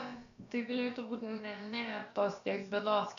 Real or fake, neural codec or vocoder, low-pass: fake; codec, 16 kHz, about 1 kbps, DyCAST, with the encoder's durations; 7.2 kHz